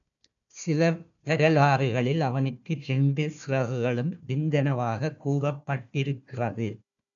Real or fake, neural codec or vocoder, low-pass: fake; codec, 16 kHz, 1 kbps, FunCodec, trained on Chinese and English, 50 frames a second; 7.2 kHz